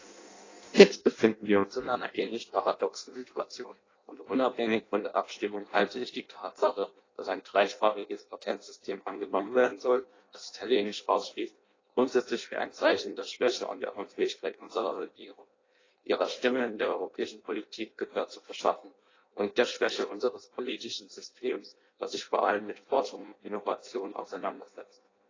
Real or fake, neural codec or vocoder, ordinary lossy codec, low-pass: fake; codec, 16 kHz in and 24 kHz out, 0.6 kbps, FireRedTTS-2 codec; AAC, 32 kbps; 7.2 kHz